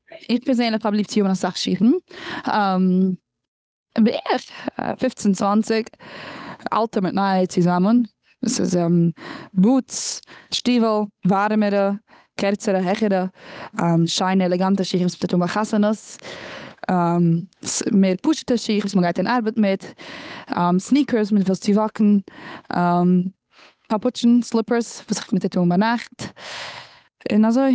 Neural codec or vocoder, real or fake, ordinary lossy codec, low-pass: codec, 16 kHz, 8 kbps, FunCodec, trained on Chinese and English, 25 frames a second; fake; none; none